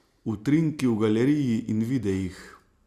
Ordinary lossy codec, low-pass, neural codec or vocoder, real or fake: Opus, 64 kbps; 14.4 kHz; none; real